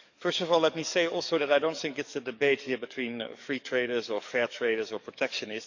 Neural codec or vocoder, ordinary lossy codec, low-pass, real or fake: codec, 44.1 kHz, 7.8 kbps, DAC; none; 7.2 kHz; fake